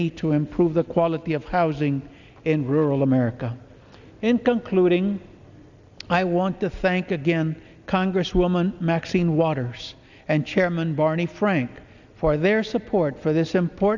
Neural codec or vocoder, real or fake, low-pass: none; real; 7.2 kHz